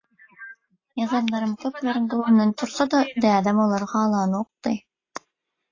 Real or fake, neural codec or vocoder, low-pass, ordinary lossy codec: real; none; 7.2 kHz; AAC, 32 kbps